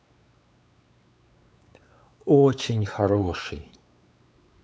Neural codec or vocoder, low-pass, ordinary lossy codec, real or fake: codec, 16 kHz, 4 kbps, X-Codec, WavLM features, trained on Multilingual LibriSpeech; none; none; fake